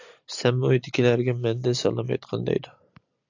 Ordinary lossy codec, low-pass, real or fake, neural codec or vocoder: AAC, 48 kbps; 7.2 kHz; real; none